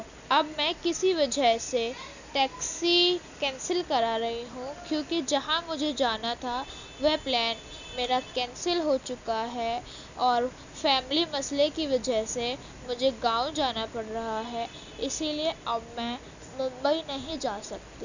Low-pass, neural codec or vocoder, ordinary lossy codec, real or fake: 7.2 kHz; none; none; real